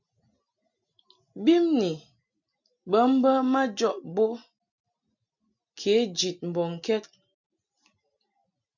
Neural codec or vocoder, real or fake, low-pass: none; real; 7.2 kHz